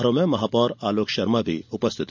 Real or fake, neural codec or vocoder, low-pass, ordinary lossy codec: real; none; 7.2 kHz; none